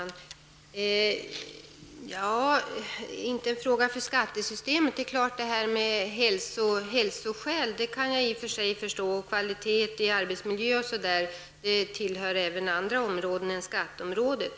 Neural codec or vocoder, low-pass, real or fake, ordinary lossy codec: none; none; real; none